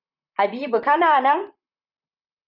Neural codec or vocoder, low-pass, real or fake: vocoder, 44.1 kHz, 128 mel bands, Pupu-Vocoder; 5.4 kHz; fake